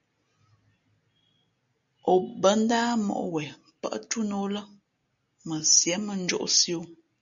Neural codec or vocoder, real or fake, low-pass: none; real; 7.2 kHz